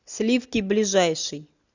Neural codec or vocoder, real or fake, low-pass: none; real; 7.2 kHz